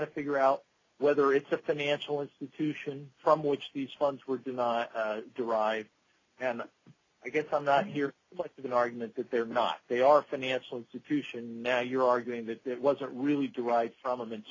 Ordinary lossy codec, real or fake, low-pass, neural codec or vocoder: AAC, 32 kbps; real; 7.2 kHz; none